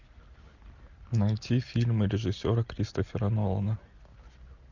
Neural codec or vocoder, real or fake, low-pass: none; real; 7.2 kHz